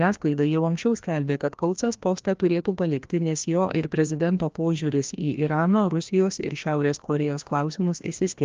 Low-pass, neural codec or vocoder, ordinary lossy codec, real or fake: 7.2 kHz; codec, 16 kHz, 1 kbps, FreqCodec, larger model; Opus, 32 kbps; fake